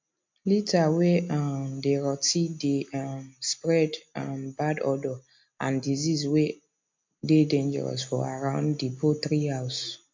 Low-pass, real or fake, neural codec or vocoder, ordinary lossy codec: 7.2 kHz; real; none; MP3, 48 kbps